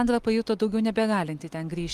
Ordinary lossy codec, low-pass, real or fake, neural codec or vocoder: Opus, 32 kbps; 14.4 kHz; real; none